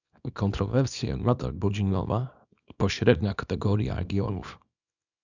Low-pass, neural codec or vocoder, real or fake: 7.2 kHz; codec, 24 kHz, 0.9 kbps, WavTokenizer, small release; fake